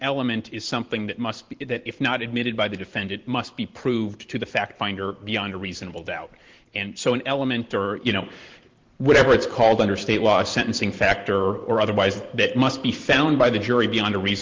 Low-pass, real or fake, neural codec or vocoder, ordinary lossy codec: 7.2 kHz; fake; vocoder, 44.1 kHz, 128 mel bands every 512 samples, BigVGAN v2; Opus, 16 kbps